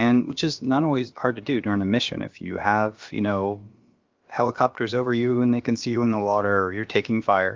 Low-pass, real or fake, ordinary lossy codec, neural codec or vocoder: 7.2 kHz; fake; Opus, 32 kbps; codec, 16 kHz, about 1 kbps, DyCAST, with the encoder's durations